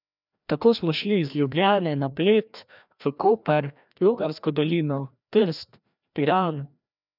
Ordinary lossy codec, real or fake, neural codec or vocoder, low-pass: none; fake; codec, 16 kHz, 1 kbps, FreqCodec, larger model; 5.4 kHz